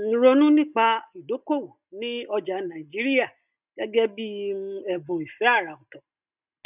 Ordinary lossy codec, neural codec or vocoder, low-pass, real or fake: none; none; 3.6 kHz; real